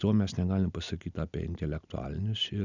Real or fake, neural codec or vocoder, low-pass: real; none; 7.2 kHz